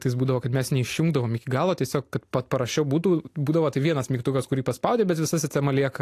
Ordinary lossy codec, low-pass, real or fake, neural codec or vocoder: AAC, 64 kbps; 14.4 kHz; real; none